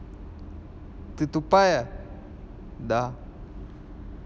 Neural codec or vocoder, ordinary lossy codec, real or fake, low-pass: none; none; real; none